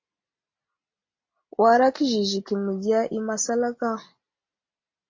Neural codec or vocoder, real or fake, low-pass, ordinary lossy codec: none; real; 7.2 kHz; MP3, 32 kbps